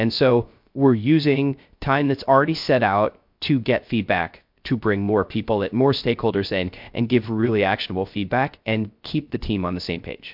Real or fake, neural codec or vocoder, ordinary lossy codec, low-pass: fake; codec, 16 kHz, 0.3 kbps, FocalCodec; MP3, 48 kbps; 5.4 kHz